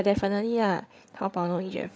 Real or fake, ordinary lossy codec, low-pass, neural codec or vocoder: fake; none; none; codec, 16 kHz, 8 kbps, FreqCodec, larger model